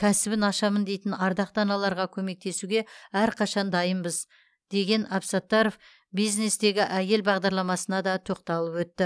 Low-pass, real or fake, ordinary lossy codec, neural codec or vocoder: none; real; none; none